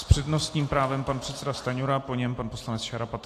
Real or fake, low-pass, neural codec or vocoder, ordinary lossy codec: real; 14.4 kHz; none; AAC, 48 kbps